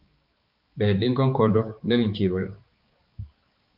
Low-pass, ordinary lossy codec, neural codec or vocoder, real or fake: 5.4 kHz; Opus, 24 kbps; codec, 16 kHz, 4 kbps, X-Codec, HuBERT features, trained on balanced general audio; fake